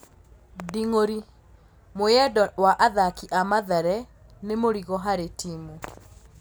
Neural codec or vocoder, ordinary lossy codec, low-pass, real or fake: none; none; none; real